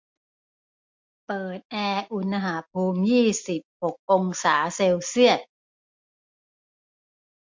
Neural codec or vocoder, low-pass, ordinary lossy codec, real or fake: none; 7.2 kHz; MP3, 48 kbps; real